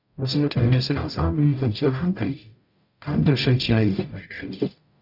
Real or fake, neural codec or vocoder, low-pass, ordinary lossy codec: fake; codec, 44.1 kHz, 0.9 kbps, DAC; 5.4 kHz; none